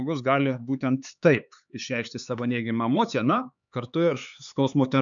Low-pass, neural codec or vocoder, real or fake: 7.2 kHz; codec, 16 kHz, 4 kbps, X-Codec, HuBERT features, trained on LibriSpeech; fake